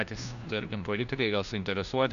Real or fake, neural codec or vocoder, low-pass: fake; codec, 16 kHz, 1 kbps, FunCodec, trained on LibriTTS, 50 frames a second; 7.2 kHz